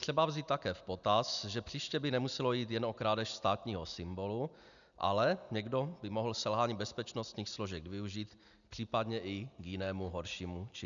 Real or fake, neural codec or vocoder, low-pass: real; none; 7.2 kHz